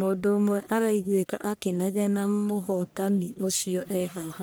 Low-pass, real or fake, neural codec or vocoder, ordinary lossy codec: none; fake; codec, 44.1 kHz, 1.7 kbps, Pupu-Codec; none